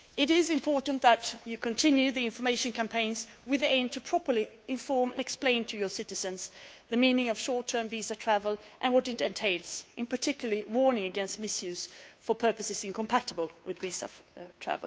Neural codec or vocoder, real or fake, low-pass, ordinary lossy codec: codec, 16 kHz, 2 kbps, FunCodec, trained on Chinese and English, 25 frames a second; fake; none; none